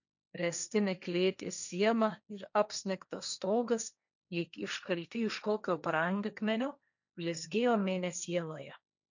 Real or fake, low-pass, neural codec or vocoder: fake; 7.2 kHz; codec, 16 kHz, 1.1 kbps, Voila-Tokenizer